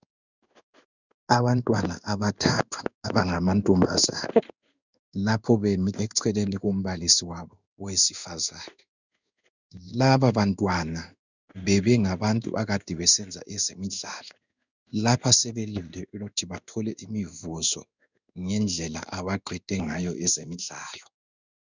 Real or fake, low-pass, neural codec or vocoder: fake; 7.2 kHz; codec, 16 kHz in and 24 kHz out, 1 kbps, XY-Tokenizer